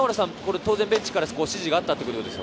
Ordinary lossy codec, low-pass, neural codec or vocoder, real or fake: none; none; none; real